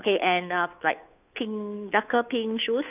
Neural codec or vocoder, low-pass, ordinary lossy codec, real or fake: codec, 16 kHz, 2 kbps, FunCodec, trained on Chinese and English, 25 frames a second; 3.6 kHz; none; fake